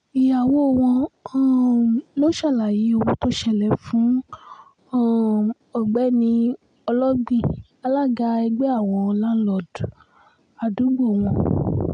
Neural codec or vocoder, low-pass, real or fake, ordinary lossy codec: none; 9.9 kHz; real; MP3, 96 kbps